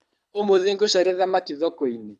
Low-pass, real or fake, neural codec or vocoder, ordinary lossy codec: none; fake; codec, 24 kHz, 6 kbps, HILCodec; none